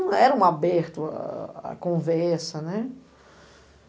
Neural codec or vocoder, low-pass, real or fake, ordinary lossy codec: none; none; real; none